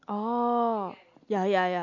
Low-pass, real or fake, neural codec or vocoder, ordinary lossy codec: 7.2 kHz; real; none; MP3, 48 kbps